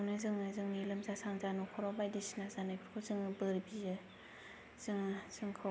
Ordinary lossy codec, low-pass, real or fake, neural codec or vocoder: none; none; real; none